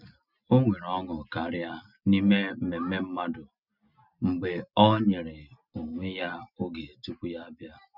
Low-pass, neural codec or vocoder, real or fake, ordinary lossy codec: 5.4 kHz; none; real; none